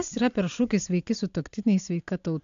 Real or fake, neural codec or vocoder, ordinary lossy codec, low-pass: real; none; AAC, 64 kbps; 7.2 kHz